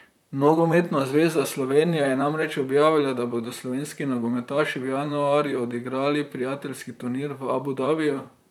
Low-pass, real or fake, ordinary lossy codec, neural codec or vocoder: 19.8 kHz; fake; none; vocoder, 44.1 kHz, 128 mel bands, Pupu-Vocoder